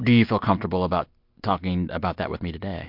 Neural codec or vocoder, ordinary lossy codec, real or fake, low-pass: none; MP3, 48 kbps; real; 5.4 kHz